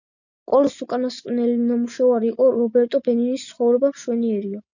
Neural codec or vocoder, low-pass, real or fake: none; 7.2 kHz; real